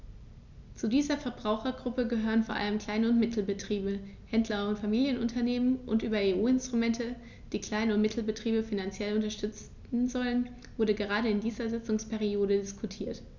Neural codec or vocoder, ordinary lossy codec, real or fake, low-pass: none; none; real; 7.2 kHz